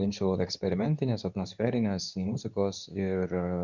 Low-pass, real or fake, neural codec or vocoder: 7.2 kHz; fake; codec, 24 kHz, 0.9 kbps, WavTokenizer, medium speech release version 2